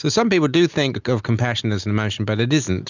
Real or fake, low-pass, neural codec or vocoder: real; 7.2 kHz; none